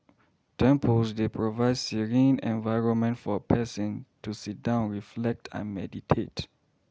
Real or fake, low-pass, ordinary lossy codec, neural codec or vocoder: real; none; none; none